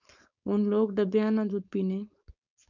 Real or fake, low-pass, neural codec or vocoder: fake; 7.2 kHz; codec, 16 kHz, 4.8 kbps, FACodec